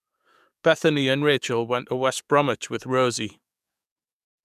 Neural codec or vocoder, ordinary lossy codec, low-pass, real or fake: codec, 44.1 kHz, 7.8 kbps, DAC; none; 14.4 kHz; fake